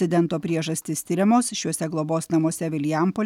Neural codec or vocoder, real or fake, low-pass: none; real; 19.8 kHz